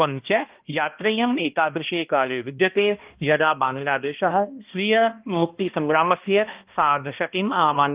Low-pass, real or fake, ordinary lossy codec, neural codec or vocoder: 3.6 kHz; fake; Opus, 32 kbps; codec, 16 kHz, 1 kbps, X-Codec, HuBERT features, trained on balanced general audio